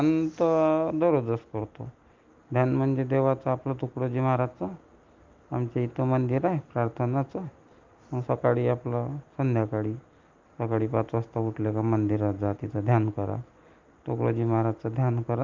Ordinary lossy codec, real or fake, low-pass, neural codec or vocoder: Opus, 32 kbps; real; 7.2 kHz; none